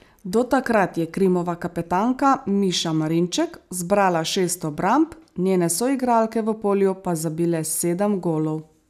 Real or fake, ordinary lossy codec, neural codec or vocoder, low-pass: real; none; none; 14.4 kHz